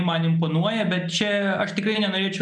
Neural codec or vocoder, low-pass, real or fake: none; 9.9 kHz; real